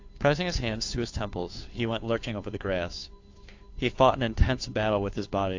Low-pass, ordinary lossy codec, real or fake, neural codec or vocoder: 7.2 kHz; AAC, 48 kbps; fake; codec, 16 kHz, 2 kbps, FunCodec, trained on Chinese and English, 25 frames a second